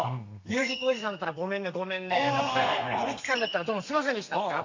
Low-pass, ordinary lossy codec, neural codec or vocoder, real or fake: 7.2 kHz; AAC, 48 kbps; codec, 32 kHz, 1.9 kbps, SNAC; fake